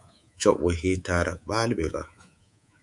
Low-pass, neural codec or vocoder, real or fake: 10.8 kHz; codec, 24 kHz, 3.1 kbps, DualCodec; fake